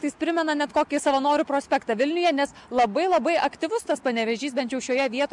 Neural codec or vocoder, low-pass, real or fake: none; 10.8 kHz; real